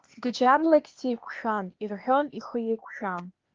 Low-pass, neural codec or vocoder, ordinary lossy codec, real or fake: 7.2 kHz; codec, 16 kHz, 0.8 kbps, ZipCodec; Opus, 32 kbps; fake